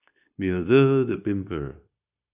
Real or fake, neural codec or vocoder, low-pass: fake; codec, 16 kHz, 0.9 kbps, LongCat-Audio-Codec; 3.6 kHz